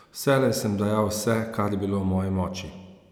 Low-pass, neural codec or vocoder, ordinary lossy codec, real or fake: none; none; none; real